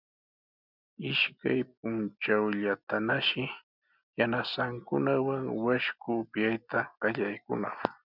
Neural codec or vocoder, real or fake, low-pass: none; real; 5.4 kHz